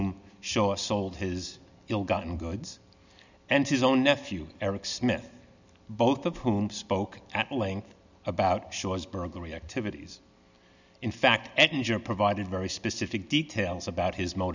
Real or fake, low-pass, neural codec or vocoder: real; 7.2 kHz; none